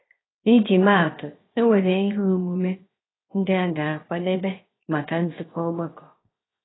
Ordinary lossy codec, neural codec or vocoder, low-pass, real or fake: AAC, 16 kbps; codec, 16 kHz, 0.7 kbps, FocalCodec; 7.2 kHz; fake